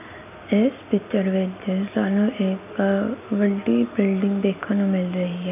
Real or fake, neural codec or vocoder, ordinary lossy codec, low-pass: real; none; none; 3.6 kHz